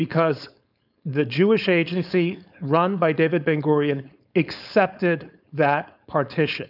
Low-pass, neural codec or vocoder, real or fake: 5.4 kHz; codec, 16 kHz, 4.8 kbps, FACodec; fake